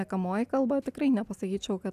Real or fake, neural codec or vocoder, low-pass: real; none; 14.4 kHz